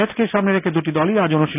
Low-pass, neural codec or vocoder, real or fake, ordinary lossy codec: 3.6 kHz; none; real; none